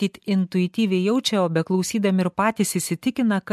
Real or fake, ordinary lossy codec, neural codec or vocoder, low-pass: real; MP3, 64 kbps; none; 14.4 kHz